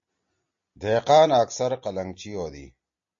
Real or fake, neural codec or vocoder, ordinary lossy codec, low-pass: real; none; MP3, 48 kbps; 7.2 kHz